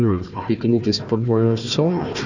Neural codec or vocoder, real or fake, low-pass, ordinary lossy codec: codec, 16 kHz, 1 kbps, FunCodec, trained on Chinese and English, 50 frames a second; fake; 7.2 kHz; none